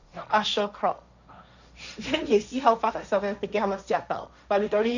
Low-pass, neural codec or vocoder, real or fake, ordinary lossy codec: 7.2 kHz; codec, 16 kHz, 1.1 kbps, Voila-Tokenizer; fake; none